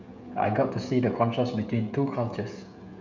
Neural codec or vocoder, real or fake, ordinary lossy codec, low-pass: codec, 16 kHz, 16 kbps, FreqCodec, smaller model; fake; none; 7.2 kHz